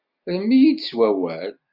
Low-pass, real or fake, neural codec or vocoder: 5.4 kHz; real; none